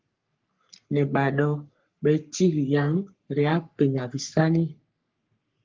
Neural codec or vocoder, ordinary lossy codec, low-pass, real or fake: codec, 44.1 kHz, 3.4 kbps, Pupu-Codec; Opus, 32 kbps; 7.2 kHz; fake